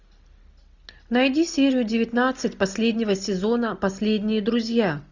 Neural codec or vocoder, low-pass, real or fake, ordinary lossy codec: none; 7.2 kHz; real; Opus, 64 kbps